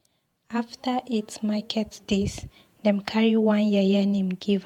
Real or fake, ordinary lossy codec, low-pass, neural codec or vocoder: fake; MP3, 96 kbps; 19.8 kHz; vocoder, 48 kHz, 128 mel bands, Vocos